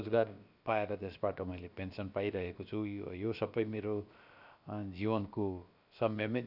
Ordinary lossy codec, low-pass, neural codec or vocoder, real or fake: none; 5.4 kHz; codec, 16 kHz, about 1 kbps, DyCAST, with the encoder's durations; fake